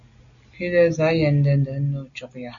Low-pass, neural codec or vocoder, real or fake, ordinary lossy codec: 7.2 kHz; none; real; MP3, 96 kbps